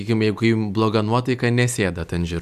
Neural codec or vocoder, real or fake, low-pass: none; real; 14.4 kHz